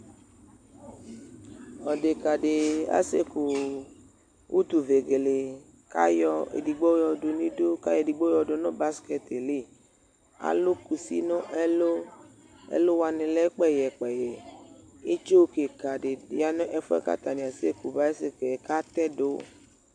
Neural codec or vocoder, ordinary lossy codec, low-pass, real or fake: none; MP3, 64 kbps; 9.9 kHz; real